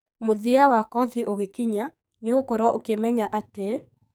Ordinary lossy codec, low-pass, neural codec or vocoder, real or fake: none; none; codec, 44.1 kHz, 2.6 kbps, SNAC; fake